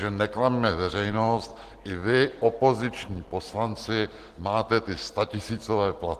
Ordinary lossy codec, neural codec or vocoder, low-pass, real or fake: Opus, 16 kbps; none; 14.4 kHz; real